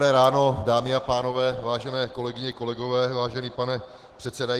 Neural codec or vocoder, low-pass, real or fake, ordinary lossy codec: autoencoder, 48 kHz, 128 numbers a frame, DAC-VAE, trained on Japanese speech; 14.4 kHz; fake; Opus, 16 kbps